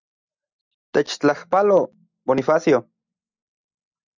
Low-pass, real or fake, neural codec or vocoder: 7.2 kHz; real; none